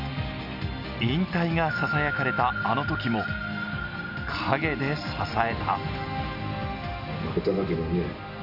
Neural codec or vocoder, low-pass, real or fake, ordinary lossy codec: none; 5.4 kHz; real; none